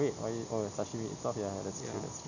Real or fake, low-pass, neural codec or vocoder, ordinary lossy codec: real; 7.2 kHz; none; none